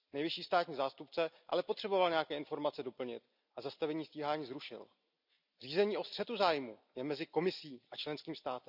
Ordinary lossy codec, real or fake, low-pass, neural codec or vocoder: none; real; 5.4 kHz; none